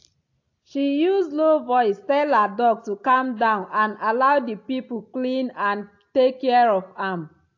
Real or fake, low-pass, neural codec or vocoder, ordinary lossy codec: real; 7.2 kHz; none; AAC, 48 kbps